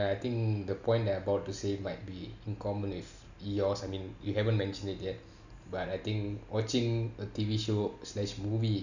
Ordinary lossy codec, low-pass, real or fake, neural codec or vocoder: none; 7.2 kHz; real; none